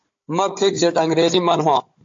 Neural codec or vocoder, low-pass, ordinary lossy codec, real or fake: codec, 16 kHz, 16 kbps, FunCodec, trained on Chinese and English, 50 frames a second; 7.2 kHz; AAC, 48 kbps; fake